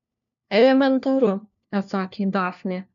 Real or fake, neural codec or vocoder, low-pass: fake; codec, 16 kHz, 1 kbps, FunCodec, trained on LibriTTS, 50 frames a second; 7.2 kHz